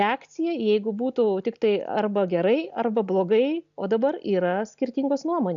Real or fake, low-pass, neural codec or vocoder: real; 7.2 kHz; none